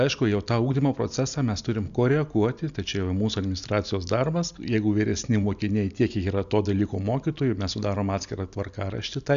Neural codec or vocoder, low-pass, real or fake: none; 7.2 kHz; real